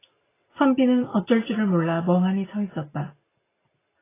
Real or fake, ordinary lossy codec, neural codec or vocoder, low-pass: real; AAC, 16 kbps; none; 3.6 kHz